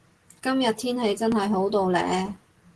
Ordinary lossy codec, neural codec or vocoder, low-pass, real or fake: Opus, 16 kbps; none; 10.8 kHz; real